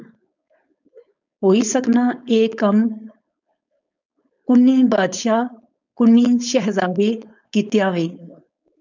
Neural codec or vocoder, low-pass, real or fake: codec, 16 kHz, 4.8 kbps, FACodec; 7.2 kHz; fake